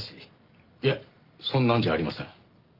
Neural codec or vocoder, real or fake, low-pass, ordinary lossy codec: none; real; 5.4 kHz; Opus, 24 kbps